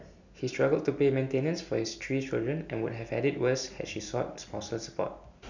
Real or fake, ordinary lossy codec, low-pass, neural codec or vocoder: real; none; 7.2 kHz; none